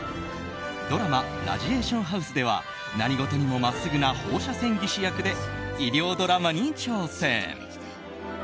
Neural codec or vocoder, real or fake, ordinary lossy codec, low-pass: none; real; none; none